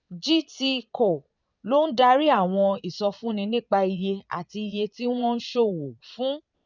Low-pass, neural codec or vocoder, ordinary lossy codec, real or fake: 7.2 kHz; vocoder, 22.05 kHz, 80 mel bands, Vocos; none; fake